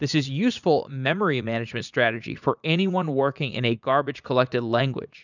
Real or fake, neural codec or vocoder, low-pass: fake; vocoder, 44.1 kHz, 80 mel bands, Vocos; 7.2 kHz